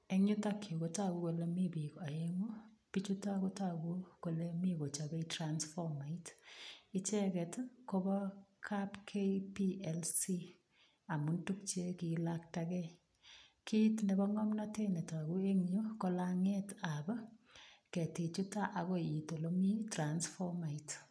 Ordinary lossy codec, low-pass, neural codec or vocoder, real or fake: none; none; none; real